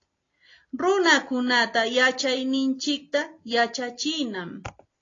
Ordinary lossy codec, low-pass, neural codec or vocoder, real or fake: AAC, 32 kbps; 7.2 kHz; none; real